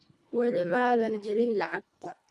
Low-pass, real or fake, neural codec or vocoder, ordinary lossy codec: none; fake; codec, 24 kHz, 1.5 kbps, HILCodec; none